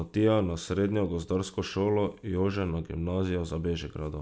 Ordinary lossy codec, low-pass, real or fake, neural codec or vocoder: none; none; real; none